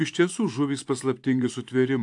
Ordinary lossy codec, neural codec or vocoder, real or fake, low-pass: AAC, 64 kbps; vocoder, 44.1 kHz, 128 mel bands every 512 samples, BigVGAN v2; fake; 10.8 kHz